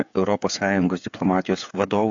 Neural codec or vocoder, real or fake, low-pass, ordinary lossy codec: codec, 16 kHz, 8 kbps, FreqCodec, smaller model; fake; 7.2 kHz; AAC, 64 kbps